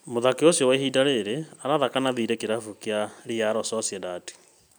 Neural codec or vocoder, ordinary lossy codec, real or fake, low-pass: none; none; real; none